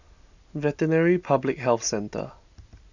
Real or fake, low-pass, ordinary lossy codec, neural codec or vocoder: real; 7.2 kHz; none; none